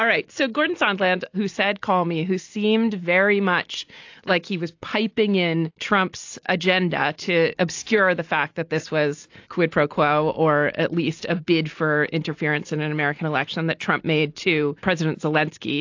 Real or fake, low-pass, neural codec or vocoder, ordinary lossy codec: real; 7.2 kHz; none; AAC, 48 kbps